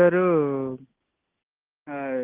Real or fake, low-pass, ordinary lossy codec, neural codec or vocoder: real; 3.6 kHz; Opus, 16 kbps; none